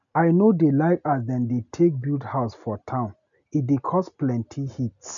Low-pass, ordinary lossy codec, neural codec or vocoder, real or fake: 7.2 kHz; none; none; real